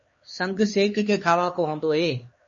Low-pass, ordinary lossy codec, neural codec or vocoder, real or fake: 7.2 kHz; MP3, 32 kbps; codec, 16 kHz, 2 kbps, X-Codec, HuBERT features, trained on LibriSpeech; fake